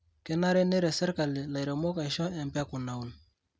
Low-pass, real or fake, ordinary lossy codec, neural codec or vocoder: none; real; none; none